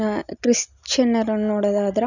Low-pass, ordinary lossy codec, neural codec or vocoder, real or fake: 7.2 kHz; none; none; real